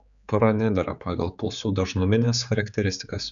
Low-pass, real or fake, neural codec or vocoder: 7.2 kHz; fake; codec, 16 kHz, 4 kbps, X-Codec, HuBERT features, trained on general audio